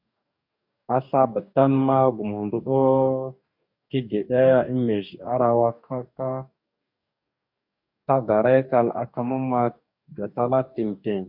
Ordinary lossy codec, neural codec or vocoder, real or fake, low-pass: MP3, 48 kbps; codec, 44.1 kHz, 2.6 kbps, DAC; fake; 5.4 kHz